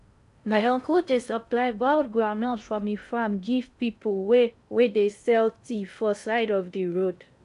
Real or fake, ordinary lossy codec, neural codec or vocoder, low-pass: fake; none; codec, 16 kHz in and 24 kHz out, 0.6 kbps, FocalCodec, streaming, 4096 codes; 10.8 kHz